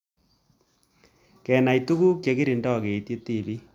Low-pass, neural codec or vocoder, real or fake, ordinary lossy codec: 19.8 kHz; none; real; none